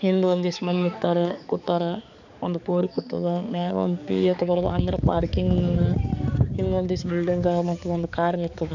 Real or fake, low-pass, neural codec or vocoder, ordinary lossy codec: fake; 7.2 kHz; codec, 16 kHz, 4 kbps, X-Codec, HuBERT features, trained on balanced general audio; none